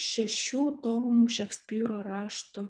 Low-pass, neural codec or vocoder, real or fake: 9.9 kHz; codec, 24 kHz, 3 kbps, HILCodec; fake